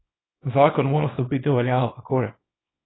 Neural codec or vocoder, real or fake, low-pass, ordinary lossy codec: codec, 24 kHz, 0.9 kbps, WavTokenizer, small release; fake; 7.2 kHz; AAC, 16 kbps